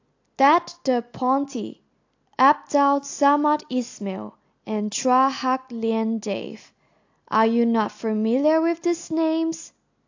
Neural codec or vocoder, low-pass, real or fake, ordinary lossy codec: none; 7.2 kHz; real; AAC, 48 kbps